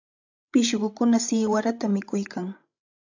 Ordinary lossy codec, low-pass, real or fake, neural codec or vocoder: AAC, 48 kbps; 7.2 kHz; fake; codec, 16 kHz, 16 kbps, FreqCodec, larger model